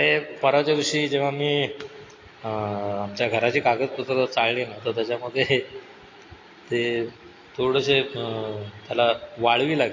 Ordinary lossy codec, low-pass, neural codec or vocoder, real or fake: AAC, 32 kbps; 7.2 kHz; none; real